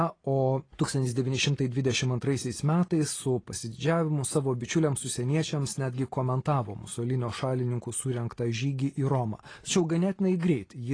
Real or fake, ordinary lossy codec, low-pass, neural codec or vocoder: real; AAC, 32 kbps; 9.9 kHz; none